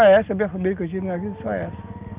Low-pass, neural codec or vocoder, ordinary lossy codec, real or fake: 3.6 kHz; none; Opus, 32 kbps; real